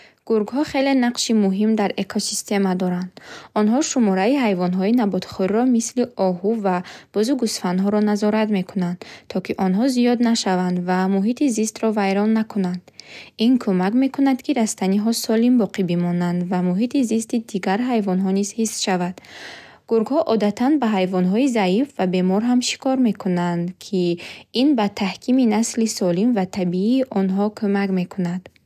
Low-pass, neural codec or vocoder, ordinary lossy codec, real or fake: 14.4 kHz; none; none; real